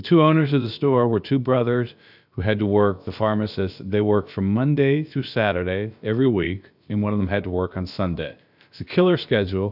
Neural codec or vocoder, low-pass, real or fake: codec, 16 kHz, about 1 kbps, DyCAST, with the encoder's durations; 5.4 kHz; fake